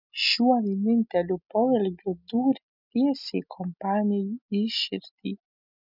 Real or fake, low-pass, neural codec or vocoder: real; 5.4 kHz; none